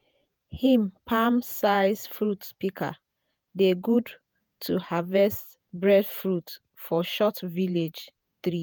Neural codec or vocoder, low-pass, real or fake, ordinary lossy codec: vocoder, 48 kHz, 128 mel bands, Vocos; none; fake; none